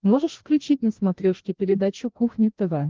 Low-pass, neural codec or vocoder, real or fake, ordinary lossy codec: 7.2 kHz; codec, 16 kHz, 1 kbps, FreqCodec, larger model; fake; Opus, 16 kbps